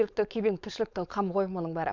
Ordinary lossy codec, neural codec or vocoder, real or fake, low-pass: none; codec, 16 kHz, 4.8 kbps, FACodec; fake; 7.2 kHz